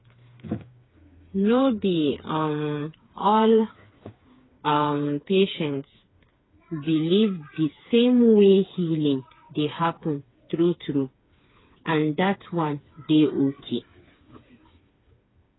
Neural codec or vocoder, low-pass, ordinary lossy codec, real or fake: codec, 16 kHz, 4 kbps, FreqCodec, smaller model; 7.2 kHz; AAC, 16 kbps; fake